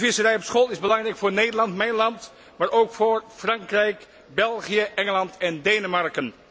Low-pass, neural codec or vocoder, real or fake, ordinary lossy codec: none; none; real; none